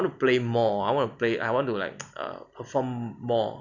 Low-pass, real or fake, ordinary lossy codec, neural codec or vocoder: 7.2 kHz; real; none; none